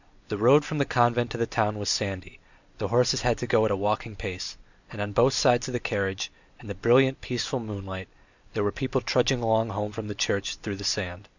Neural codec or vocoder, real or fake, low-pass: none; real; 7.2 kHz